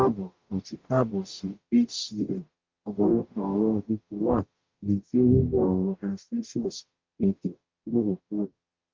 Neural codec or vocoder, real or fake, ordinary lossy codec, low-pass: codec, 44.1 kHz, 0.9 kbps, DAC; fake; Opus, 24 kbps; 7.2 kHz